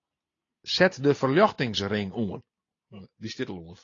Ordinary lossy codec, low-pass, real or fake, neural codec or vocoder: AAC, 32 kbps; 7.2 kHz; real; none